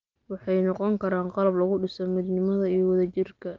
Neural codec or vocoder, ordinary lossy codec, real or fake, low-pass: none; Opus, 24 kbps; real; 7.2 kHz